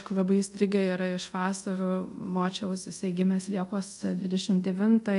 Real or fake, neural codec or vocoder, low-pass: fake; codec, 24 kHz, 0.5 kbps, DualCodec; 10.8 kHz